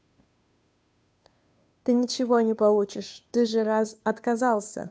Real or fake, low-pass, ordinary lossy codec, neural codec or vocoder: fake; none; none; codec, 16 kHz, 2 kbps, FunCodec, trained on Chinese and English, 25 frames a second